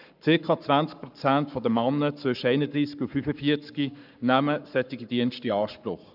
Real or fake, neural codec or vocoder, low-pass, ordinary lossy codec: fake; codec, 44.1 kHz, 7.8 kbps, Pupu-Codec; 5.4 kHz; none